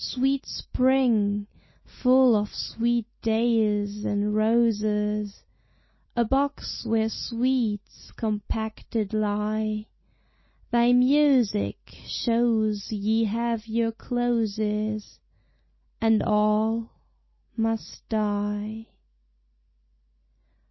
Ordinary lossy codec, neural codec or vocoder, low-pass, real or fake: MP3, 24 kbps; none; 7.2 kHz; real